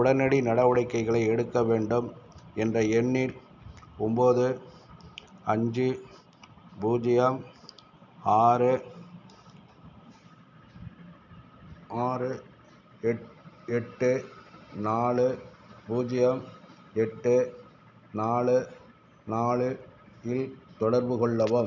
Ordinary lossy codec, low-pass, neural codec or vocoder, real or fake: none; 7.2 kHz; none; real